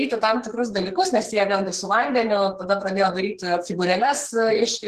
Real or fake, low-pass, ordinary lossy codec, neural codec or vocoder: fake; 14.4 kHz; Opus, 16 kbps; codec, 44.1 kHz, 2.6 kbps, SNAC